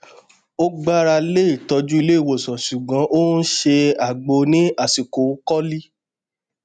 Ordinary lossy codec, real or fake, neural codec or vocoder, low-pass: none; real; none; 9.9 kHz